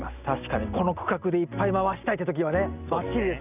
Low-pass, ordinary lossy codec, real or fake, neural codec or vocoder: 3.6 kHz; none; real; none